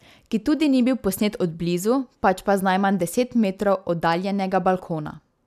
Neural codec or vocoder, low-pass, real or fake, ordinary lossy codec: none; 14.4 kHz; real; none